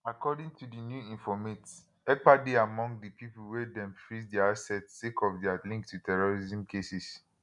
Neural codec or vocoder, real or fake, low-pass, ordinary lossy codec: none; real; 10.8 kHz; none